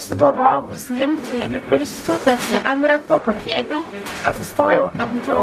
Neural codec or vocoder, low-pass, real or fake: codec, 44.1 kHz, 0.9 kbps, DAC; 14.4 kHz; fake